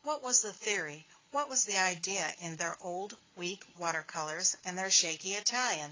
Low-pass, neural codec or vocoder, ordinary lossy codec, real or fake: 7.2 kHz; codec, 16 kHz, 4 kbps, FreqCodec, larger model; AAC, 32 kbps; fake